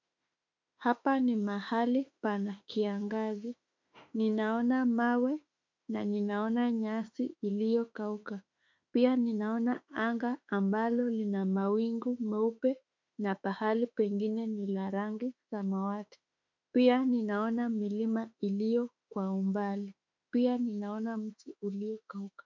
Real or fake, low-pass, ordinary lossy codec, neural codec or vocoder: fake; 7.2 kHz; MP3, 64 kbps; autoencoder, 48 kHz, 32 numbers a frame, DAC-VAE, trained on Japanese speech